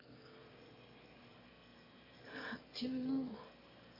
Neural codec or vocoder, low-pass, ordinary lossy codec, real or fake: autoencoder, 22.05 kHz, a latent of 192 numbers a frame, VITS, trained on one speaker; 5.4 kHz; MP3, 24 kbps; fake